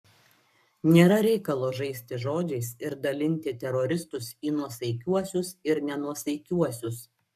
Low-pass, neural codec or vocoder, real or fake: 14.4 kHz; codec, 44.1 kHz, 7.8 kbps, Pupu-Codec; fake